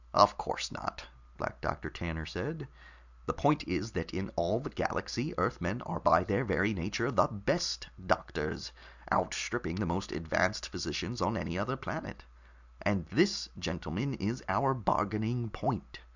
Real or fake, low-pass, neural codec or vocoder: real; 7.2 kHz; none